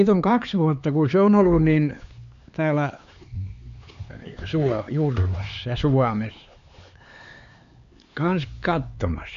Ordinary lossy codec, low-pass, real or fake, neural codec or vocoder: MP3, 64 kbps; 7.2 kHz; fake; codec, 16 kHz, 4 kbps, X-Codec, HuBERT features, trained on LibriSpeech